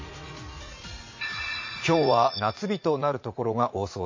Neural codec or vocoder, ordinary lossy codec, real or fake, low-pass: vocoder, 44.1 kHz, 80 mel bands, Vocos; MP3, 32 kbps; fake; 7.2 kHz